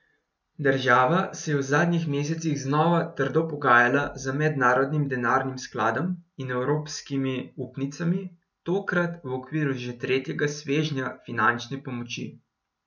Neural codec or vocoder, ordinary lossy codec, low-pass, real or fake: none; none; 7.2 kHz; real